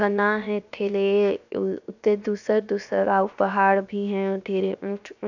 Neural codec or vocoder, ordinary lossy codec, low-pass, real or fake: codec, 16 kHz, 0.7 kbps, FocalCodec; none; 7.2 kHz; fake